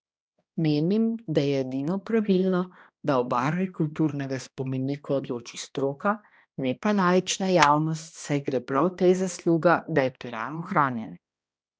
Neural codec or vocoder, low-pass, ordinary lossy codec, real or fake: codec, 16 kHz, 1 kbps, X-Codec, HuBERT features, trained on balanced general audio; none; none; fake